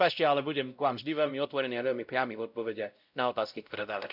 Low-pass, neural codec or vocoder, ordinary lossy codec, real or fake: 5.4 kHz; codec, 16 kHz, 0.5 kbps, X-Codec, WavLM features, trained on Multilingual LibriSpeech; none; fake